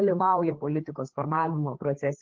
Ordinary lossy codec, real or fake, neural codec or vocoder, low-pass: Opus, 16 kbps; fake; codec, 16 kHz, 4 kbps, FreqCodec, larger model; 7.2 kHz